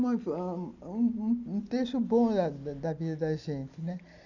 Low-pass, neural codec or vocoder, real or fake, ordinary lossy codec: 7.2 kHz; none; real; none